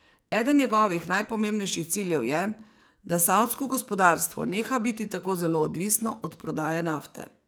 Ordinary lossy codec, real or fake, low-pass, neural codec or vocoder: none; fake; none; codec, 44.1 kHz, 2.6 kbps, SNAC